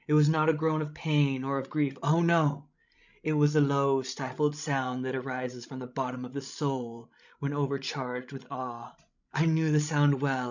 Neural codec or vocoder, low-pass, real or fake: codec, 16 kHz, 16 kbps, FreqCodec, larger model; 7.2 kHz; fake